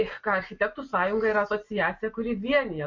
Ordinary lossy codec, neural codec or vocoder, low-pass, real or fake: MP3, 48 kbps; none; 7.2 kHz; real